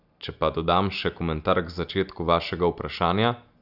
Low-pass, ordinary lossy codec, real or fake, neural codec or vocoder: 5.4 kHz; none; real; none